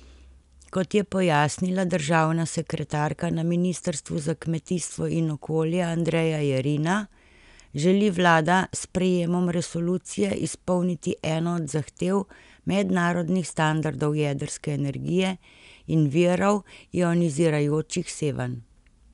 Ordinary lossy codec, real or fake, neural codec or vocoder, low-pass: none; real; none; 10.8 kHz